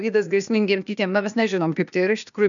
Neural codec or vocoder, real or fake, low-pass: codec, 16 kHz, 0.8 kbps, ZipCodec; fake; 7.2 kHz